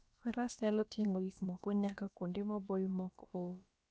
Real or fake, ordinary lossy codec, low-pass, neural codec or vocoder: fake; none; none; codec, 16 kHz, about 1 kbps, DyCAST, with the encoder's durations